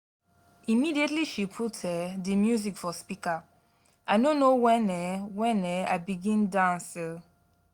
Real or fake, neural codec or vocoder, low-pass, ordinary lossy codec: real; none; 19.8 kHz; Opus, 64 kbps